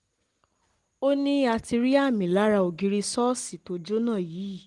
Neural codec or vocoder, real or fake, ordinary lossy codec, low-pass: none; real; Opus, 24 kbps; 10.8 kHz